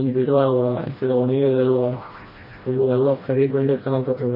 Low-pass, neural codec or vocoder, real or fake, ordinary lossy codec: 5.4 kHz; codec, 16 kHz, 1 kbps, FreqCodec, smaller model; fake; MP3, 24 kbps